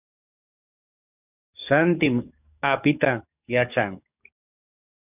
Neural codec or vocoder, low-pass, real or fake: codec, 44.1 kHz, 7.8 kbps, DAC; 3.6 kHz; fake